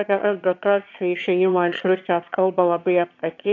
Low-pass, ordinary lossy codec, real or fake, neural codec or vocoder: 7.2 kHz; AAC, 48 kbps; fake; autoencoder, 22.05 kHz, a latent of 192 numbers a frame, VITS, trained on one speaker